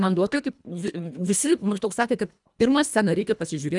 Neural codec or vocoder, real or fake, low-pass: codec, 24 kHz, 1.5 kbps, HILCodec; fake; 10.8 kHz